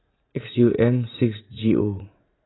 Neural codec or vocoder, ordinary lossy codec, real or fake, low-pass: vocoder, 44.1 kHz, 80 mel bands, Vocos; AAC, 16 kbps; fake; 7.2 kHz